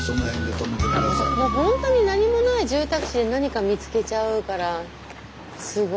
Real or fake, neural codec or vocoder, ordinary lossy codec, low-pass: real; none; none; none